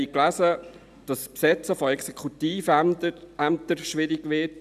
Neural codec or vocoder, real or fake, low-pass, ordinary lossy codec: none; real; 14.4 kHz; none